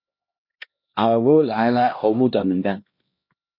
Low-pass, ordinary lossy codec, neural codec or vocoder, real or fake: 5.4 kHz; MP3, 32 kbps; codec, 16 kHz, 1 kbps, X-Codec, HuBERT features, trained on LibriSpeech; fake